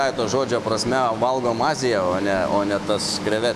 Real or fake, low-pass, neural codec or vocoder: fake; 14.4 kHz; autoencoder, 48 kHz, 128 numbers a frame, DAC-VAE, trained on Japanese speech